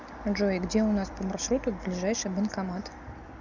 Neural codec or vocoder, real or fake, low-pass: none; real; 7.2 kHz